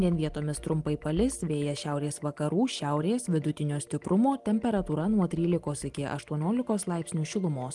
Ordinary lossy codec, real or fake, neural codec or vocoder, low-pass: Opus, 24 kbps; real; none; 10.8 kHz